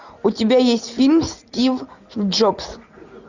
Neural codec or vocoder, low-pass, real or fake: none; 7.2 kHz; real